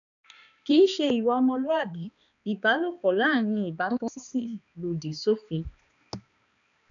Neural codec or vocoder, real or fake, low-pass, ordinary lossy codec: codec, 16 kHz, 2 kbps, X-Codec, HuBERT features, trained on balanced general audio; fake; 7.2 kHz; none